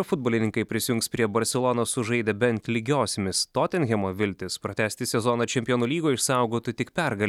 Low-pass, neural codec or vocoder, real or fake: 19.8 kHz; none; real